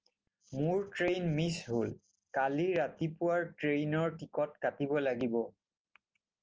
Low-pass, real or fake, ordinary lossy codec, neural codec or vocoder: 7.2 kHz; real; Opus, 24 kbps; none